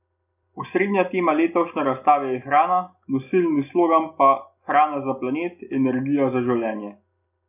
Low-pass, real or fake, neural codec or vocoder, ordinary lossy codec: 3.6 kHz; real; none; none